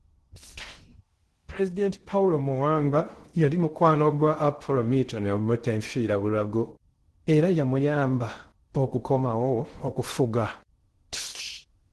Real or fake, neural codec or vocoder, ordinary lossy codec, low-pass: fake; codec, 16 kHz in and 24 kHz out, 0.6 kbps, FocalCodec, streaming, 2048 codes; Opus, 16 kbps; 10.8 kHz